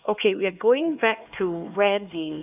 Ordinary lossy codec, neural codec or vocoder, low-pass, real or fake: none; codec, 16 kHz, 2 kbps, X-Codec, HuBERT features, trained on LibriSpeech; 3.6 kHz; fake